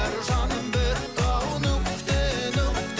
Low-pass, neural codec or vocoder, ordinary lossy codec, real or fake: none; none; none; real